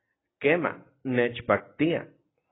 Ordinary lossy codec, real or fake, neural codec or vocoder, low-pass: AAC, 16 kbps; real; none; 7.2 kHz